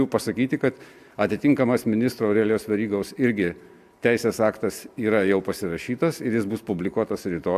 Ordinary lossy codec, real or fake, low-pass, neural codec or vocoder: AAC, 96 kbps; real; 14.4 kHz; none